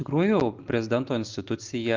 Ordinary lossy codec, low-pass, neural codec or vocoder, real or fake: Opus, 32 kbps; 7.2 kHz; none; real